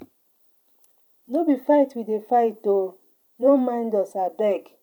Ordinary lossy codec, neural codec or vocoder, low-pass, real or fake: none; vocoder, 44.1 kHz, 128 mel bands every 512 samples, BigVGAN v2; 19.8 kHz; fake